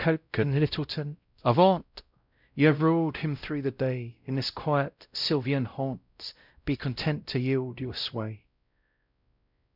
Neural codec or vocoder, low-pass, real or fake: codec, 16 kHz, 0.5 kbps, X-Codec, WavLM features, trained on Multilingual LibriSpeech; 5.4 kHz; fake